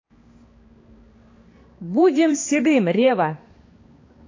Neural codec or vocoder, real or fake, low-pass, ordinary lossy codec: codec, 16 kHz, 2 kbps, X-Codec, HuBERT features, trained on balanced general audio; fake; 7.2 kHz; AAC, 32 kbps